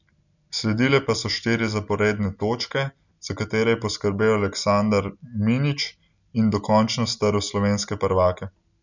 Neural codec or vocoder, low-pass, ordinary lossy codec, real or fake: none; 7.2 kHz; none; real